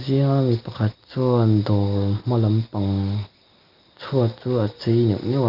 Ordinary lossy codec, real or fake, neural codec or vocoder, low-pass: Opus, 32 kbps; real; none; 5.4 kHz